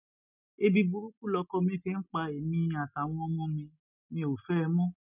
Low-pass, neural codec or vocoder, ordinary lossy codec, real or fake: 3.6 kHz; none; none; real